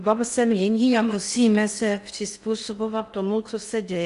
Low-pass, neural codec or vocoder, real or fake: 10.8 kHz; codec, 16 kHz in and 24 kHz out, 0.6 kbps, FocalCodec, streaming, 4096 codes; fake